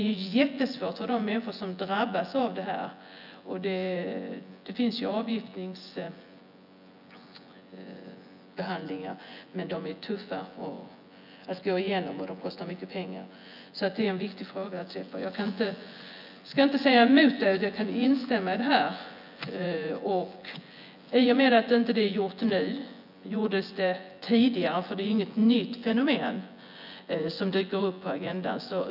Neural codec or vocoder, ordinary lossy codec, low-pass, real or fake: vocoder, 24 kHz, 100 mel bands, Vocos; none; 5.4 kHz; fake